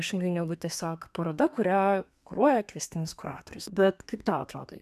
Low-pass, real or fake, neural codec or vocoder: 14.4 kHz; fake; codec, 32 kHz, 1.9 kbps, SNAC